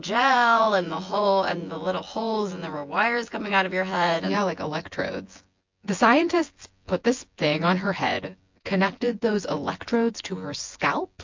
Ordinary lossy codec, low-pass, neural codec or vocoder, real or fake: MP3, 48 kbps; 7.2 kHz; vocoder, 24 kHz, 100 mel bands, Vocos; fake